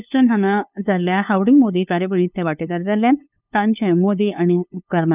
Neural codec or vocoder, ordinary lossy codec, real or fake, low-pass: codec, 16 kHz, 2 kbps, FunCodec, trained on LibriTTS, 25 frames a second; none; fake; 3.6 kHz